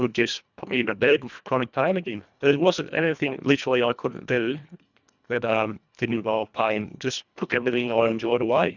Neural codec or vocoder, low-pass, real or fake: codec, 24 kHz, 1.5 kbps, HILCodec; 7.2 kHz; fake